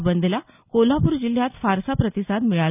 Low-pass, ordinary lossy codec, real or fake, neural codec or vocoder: 3.6 kHz; none; real; none